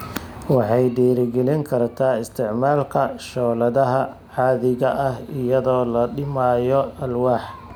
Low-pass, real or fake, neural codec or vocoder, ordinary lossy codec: none; real; none; none